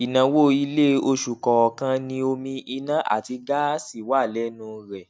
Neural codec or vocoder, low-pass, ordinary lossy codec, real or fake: none; none; none; real